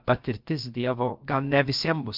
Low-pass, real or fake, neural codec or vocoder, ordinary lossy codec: 5.4 kHz; fake; codec, 16 kHz, about 1 kbps, DyCAST, with the encoder's durations; Opus, 32 kbps